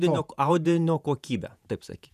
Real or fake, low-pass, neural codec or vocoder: fake; 14.4 kHz; vocoder, 48 kHz, 128 mel bands, Vocos